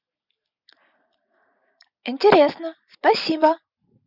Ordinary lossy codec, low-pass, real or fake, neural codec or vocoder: none; 5.4 kHz; real; none